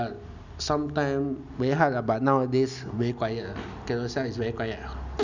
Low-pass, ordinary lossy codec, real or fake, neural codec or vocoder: 7.2 kHz; none; real; none